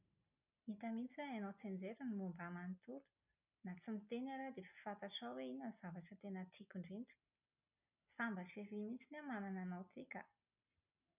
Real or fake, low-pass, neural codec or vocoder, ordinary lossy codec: real; 3.6 kHz; none; none